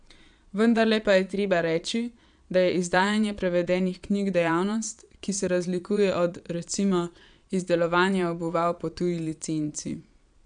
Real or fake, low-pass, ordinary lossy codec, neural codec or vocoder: fake; 9.9 kHz; none; vocoder, 22.05 kHz, 80 mel bands, Vocos